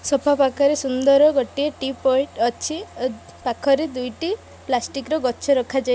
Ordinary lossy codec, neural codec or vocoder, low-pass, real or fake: none; none; none; real